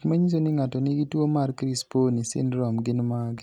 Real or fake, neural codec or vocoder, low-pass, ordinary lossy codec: real; none; 19.8 kHz; none